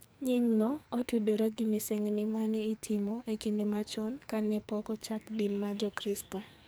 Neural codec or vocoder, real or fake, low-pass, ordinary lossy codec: codec, 44.1 kHz, 2.6 kbps, SNAC; fake; none; none